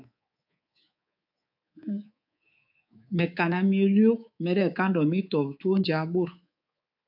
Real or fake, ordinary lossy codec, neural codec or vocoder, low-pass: fake; AAC, 48 kbps; codec, 24 kHz, 3.1 kbps, DualCodec; 5.4 kHz